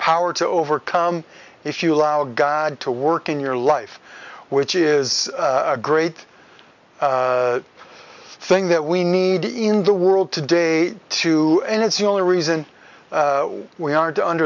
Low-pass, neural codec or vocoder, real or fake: 7.2 kHz; none; real